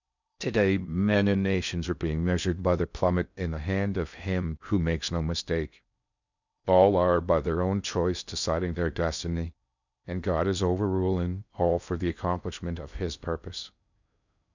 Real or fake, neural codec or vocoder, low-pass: fake; codec, 16 kHz in and 24 kHz out, 0.6 kbps, FocalCodec, streaming, 4096 codes; 7.2 kHz